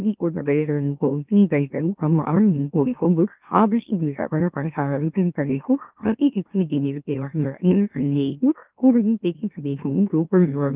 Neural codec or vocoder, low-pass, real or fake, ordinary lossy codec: autoencoder, 44.1 kHz, a latent of 192 numbers a frame, MeloTTS; 3.6 kHz; fake; Opus, 32 kbps